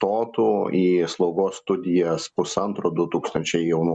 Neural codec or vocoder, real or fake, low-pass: none; real; 9.9 kHz